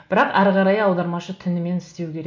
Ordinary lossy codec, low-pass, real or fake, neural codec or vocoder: MP3, 48 kbps; 7.2 kHz; real; none